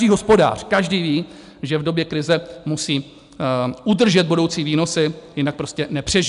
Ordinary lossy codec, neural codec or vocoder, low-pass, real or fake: MP3, 96 kbps; none; 10.8 kHz; real